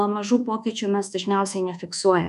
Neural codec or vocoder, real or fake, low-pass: codec, 24 kHz, 1.2 kbps, DualCodec; fake; 10.8 kHz